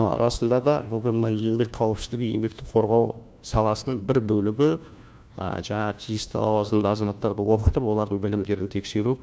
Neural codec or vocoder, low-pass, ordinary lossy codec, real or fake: codec, 16 kHz, 1 kbps, FunCodec, trained on LibriTTS, 50 frames a second; none; none; fake